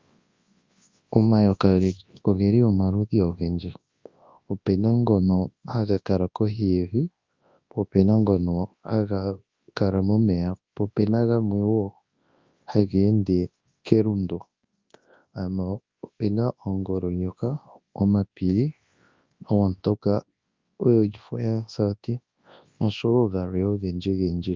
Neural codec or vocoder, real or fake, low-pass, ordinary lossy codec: codec, 24 kHz, 0.9 kbps, WavTokenizer, large speech release; fake; 7.2 kHz; Opus, 32 kbps